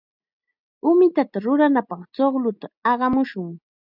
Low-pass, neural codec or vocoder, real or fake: 5.4 kHz; none; real